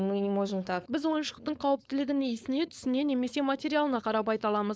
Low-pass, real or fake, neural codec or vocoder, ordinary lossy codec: none; fake; codec, 16 kHz, 4.8 kbps, FACodec; none